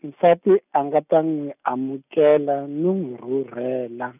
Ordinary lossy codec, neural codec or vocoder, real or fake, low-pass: none; none; real; 3.6 kHz